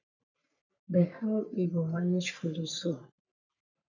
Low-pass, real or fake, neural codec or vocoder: 7.2 kHz; fake; codec, 44.1 kHz, 3.4 kbps, Pupu-Codec